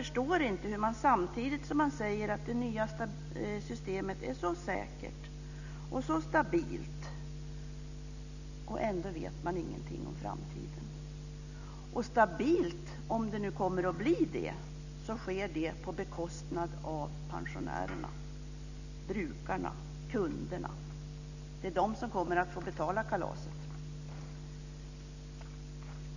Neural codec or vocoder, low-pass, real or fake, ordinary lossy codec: none; 7.2 kHz; real; none